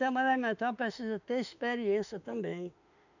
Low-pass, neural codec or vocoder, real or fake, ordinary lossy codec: 7.2 kHz; autoencoder, 48 kHz, 32 numbers a frame, DAC-VAE, trained on Japanese speech; fake; none